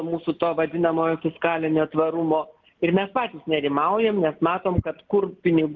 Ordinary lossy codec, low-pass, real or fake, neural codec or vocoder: Opus, 32 kbps; 7.2 kHz; real; none